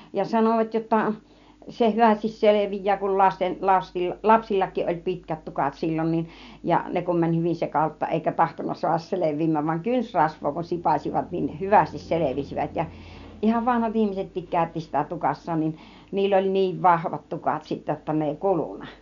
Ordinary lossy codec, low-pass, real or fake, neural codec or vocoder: none; 7.2 kHz; real; none